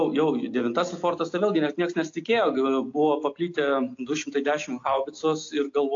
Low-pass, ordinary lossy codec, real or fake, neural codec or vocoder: 7.2 kHz; AAC, 48 kbps; real; none